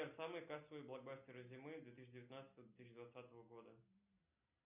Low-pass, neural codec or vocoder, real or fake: 3.6 kHz; none; real